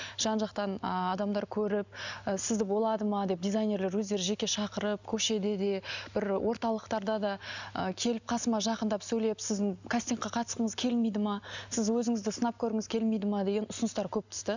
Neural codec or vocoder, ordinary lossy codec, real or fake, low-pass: none; none; real; 7.2 kHz